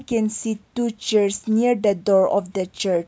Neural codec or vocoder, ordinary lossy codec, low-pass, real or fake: none; none; none; real